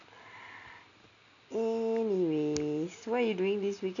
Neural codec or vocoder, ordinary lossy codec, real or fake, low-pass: none; none; real; 7.2 kHz